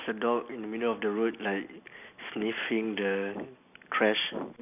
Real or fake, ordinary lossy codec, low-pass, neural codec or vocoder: real; AAC, 32 kbps; 3.6 kHz; none